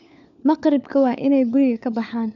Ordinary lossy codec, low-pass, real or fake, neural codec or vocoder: none; 7.2 kHz; fake; codec, 16 kHz, 4 kbps, FunCodec, trained on LibriTTS, 50 frames a second